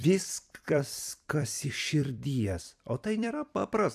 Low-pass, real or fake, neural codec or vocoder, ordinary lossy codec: 14.4 kHz; fake; vocoder, 44.1 kHz, 128 mel bands every 256 samples, BigVGAN v2; AAC, 96 kbps